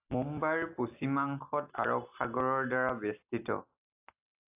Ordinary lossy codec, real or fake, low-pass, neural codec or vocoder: AAC, 32 kbps; real; 3.6 kHz; none